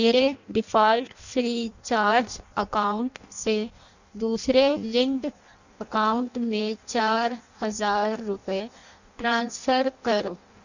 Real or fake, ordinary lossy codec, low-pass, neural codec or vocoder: fake; none; 7.2 kHz; codec, 16 kHz in and 24 kHz out, 0.6 kbps, FireRedTTS-2 codec